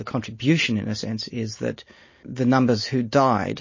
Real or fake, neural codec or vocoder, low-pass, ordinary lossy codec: real; none; 7.2 kHz; MP3, 32 kbps